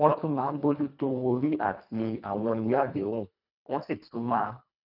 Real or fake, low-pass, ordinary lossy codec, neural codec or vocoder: fake; 5.4 kHz; none; codec, 24 kHz, 1.5 kbps, HILCodec